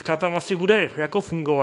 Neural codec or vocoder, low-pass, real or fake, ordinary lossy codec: codec, 24 kHz, 0.9 kbps, WavTokenizer, small release; 10.8 kHz; fake; AAC, 64 kbps